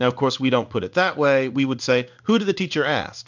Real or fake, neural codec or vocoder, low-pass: fake; codec, 16 kHz in and 24 kHz out, 1 kbps, XY-Tokenizer; 7.2 kHz